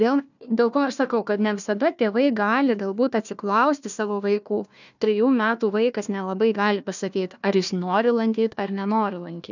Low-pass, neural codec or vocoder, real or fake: 7.2 kHz; codec, 16 kHz, 1 kbps, FunCodec, trained on Chinese and English, 50 frames a second; fake